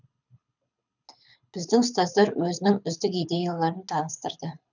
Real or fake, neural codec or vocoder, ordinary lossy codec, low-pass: fake; codec, 24 kHz, 6 kbps, HILCodec; none; 7.2 kHz